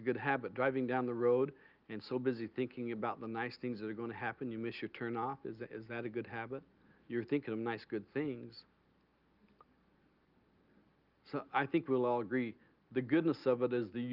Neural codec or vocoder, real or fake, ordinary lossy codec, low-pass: none; real; Opus, 32 kbps; 5.4 kHz